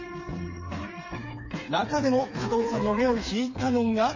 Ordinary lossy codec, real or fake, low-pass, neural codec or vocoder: MP3, 32 kbps; fake; 7.2 kHz; codec, 16 kHz, 4 kbps, FreqCodec, smaller model